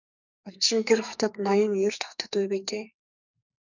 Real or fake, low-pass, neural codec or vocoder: fake; 7.2 kHz; codec, 44.1 kHz, 2.6 kbps, SNAC